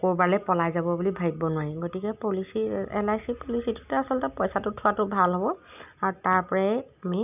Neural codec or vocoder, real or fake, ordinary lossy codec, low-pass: none; real; none; 3.6 kHz